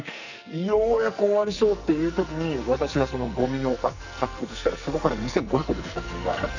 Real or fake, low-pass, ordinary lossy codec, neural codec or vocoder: fake; 7.2 kHz; none; codec, 44.1 kHz, 2.6 kbps, SNAC